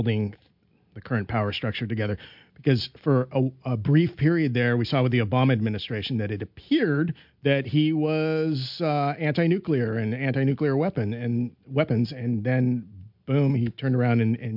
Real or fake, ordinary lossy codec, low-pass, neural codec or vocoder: real; MP3, 48 kbps; 5.4 kHz; none